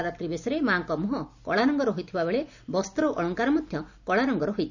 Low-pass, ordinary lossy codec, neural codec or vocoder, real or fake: 7.2 kHz; none; none; real